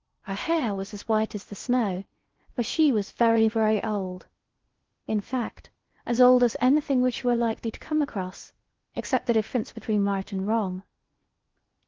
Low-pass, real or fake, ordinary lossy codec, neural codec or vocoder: 7.2 kHz; fake; Opus, 16 kbps; codec, 16 kHz in and 24 kHz out, 0.6 kbps, FocalCodec, streaming, 4096 codes